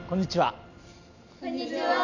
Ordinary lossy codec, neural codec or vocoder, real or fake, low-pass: none; none; real; 7.2 kHz